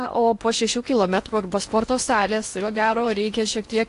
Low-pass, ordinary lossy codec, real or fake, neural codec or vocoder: 10.8 kHz; AAC, 48 kbps; fake; codec, 16 kHz in and 24 kHz out, 0.8 kbps, FocalCodec, streaming, 65536 codes